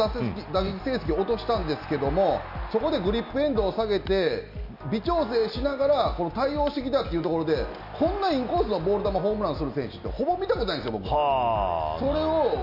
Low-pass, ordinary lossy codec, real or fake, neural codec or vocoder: 5.4 kHz; none; real; none